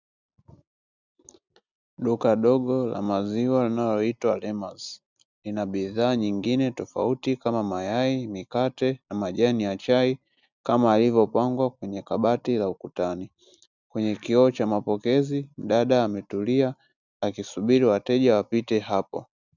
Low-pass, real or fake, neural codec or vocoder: 7.2 kHz; real; none